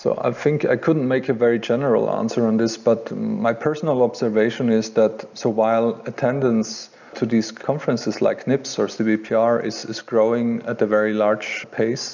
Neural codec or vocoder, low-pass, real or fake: none; 7.2 kHz; real